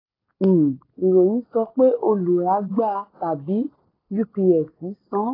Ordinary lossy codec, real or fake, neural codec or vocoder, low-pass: AAC, 24 kbps; real; none; 5.4 kHz